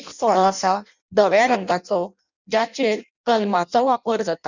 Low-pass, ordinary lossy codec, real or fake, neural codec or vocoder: 7.2 kHz; none; fake; codec, 16 kHz in and 24 kHz out, 0.6 kbps, FireRedTTS-2 codec